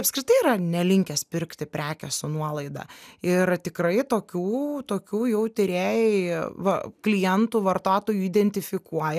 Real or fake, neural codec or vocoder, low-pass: real; none; 14.4 kHz